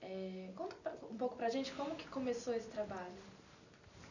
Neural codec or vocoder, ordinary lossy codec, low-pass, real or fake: none; none; 7.2 kHz; real